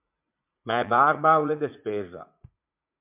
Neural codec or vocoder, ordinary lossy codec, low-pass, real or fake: vocoder, 44.1 kHz, 80 mel bands, Vocos; MP3, 32 kbps; 3.6 kHz; fake